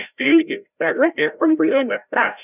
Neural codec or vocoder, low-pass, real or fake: codec, 16 kHz, 0.5 kbps, FreqCodec, larger model; 3.6 kHz; fake